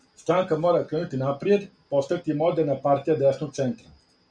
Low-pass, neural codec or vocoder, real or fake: 9.9 kHz; none; real